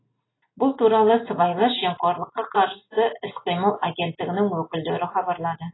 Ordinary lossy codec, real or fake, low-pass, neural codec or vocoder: AAC, 16 kbps; real; 7.2 kHz; none